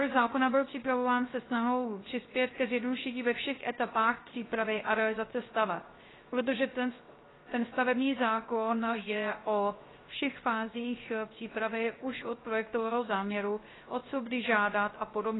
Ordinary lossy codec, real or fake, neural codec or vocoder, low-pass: AAC, 16 kbps; fake; codec, 16 kHz, 0.3 kbps, FocalCodec; 7.2 kHz